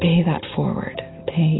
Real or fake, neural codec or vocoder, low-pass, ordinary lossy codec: real; none; 7.2 kHz; AAC, 16 kbps